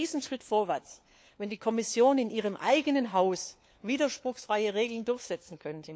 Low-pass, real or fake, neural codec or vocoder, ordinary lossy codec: none; fake; codec, 16 kHz, 4 kbps, FunCodec, trained on LibriTTS, 50 frames a second; none